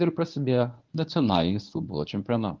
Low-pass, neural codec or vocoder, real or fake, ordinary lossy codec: 7.2 kHz; codec, 24 kHz, 0.9 kbps, WavTokenizer, medium speech release version 1; fake; Opus, 32 kbps